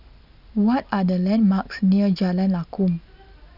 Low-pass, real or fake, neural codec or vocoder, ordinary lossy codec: 5.4 kHz; real; none; none